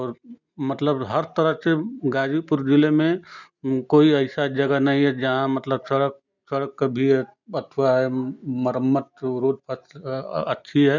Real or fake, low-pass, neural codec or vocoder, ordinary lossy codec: real; 7.2 kHz; none; none